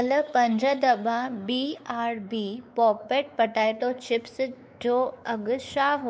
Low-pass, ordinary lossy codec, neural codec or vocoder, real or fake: none; none; codec, 16 kHz, 4 kbps, X-Codec, WavLM features, trained on Multilingual LibriSpeech; fake